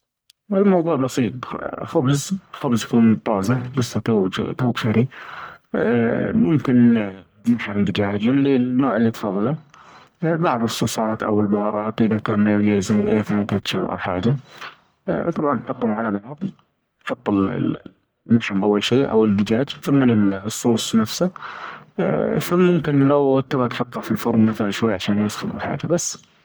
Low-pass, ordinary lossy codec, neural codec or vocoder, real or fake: none; none; codec, 44.1 kHz, 1.7 kbps, Pupu-Codec; fake